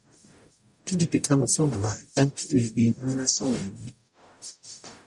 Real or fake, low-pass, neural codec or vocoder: fake; 10.8 kHz; codec, 44.1 kHz, 0.9 kbps, DAC